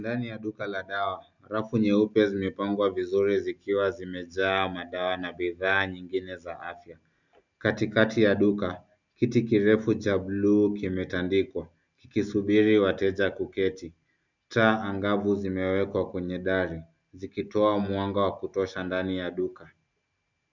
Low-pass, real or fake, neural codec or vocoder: 7.2 kHz; real; none